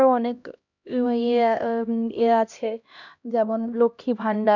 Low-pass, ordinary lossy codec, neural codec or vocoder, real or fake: 7.2 kHz; none; codec, 16 kHz, 1 kbps, X-Codec, HuBERT features, trained on LibriSpeech; fake